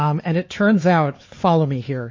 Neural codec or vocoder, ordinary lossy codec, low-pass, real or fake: codec, 16 kHz, 4 kbps, X-Codec, HuBERT features, trained on LibriSpeech; MP3, 32 kbps; 7.2 kHz; fake